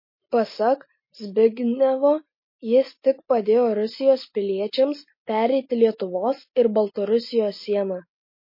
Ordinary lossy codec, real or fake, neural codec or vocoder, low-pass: MP3, 24 kbps; real; none; 5.4 kHz